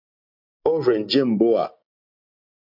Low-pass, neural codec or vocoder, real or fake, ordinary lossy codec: 5.4 kHz; none; real; MP3, 48 kbps